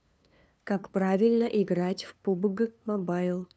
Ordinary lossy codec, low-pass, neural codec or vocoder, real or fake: none; none; codec, 16 kHz, 2 kbps, FunCodec, trained on LibriTTS, 25 frames a second; fake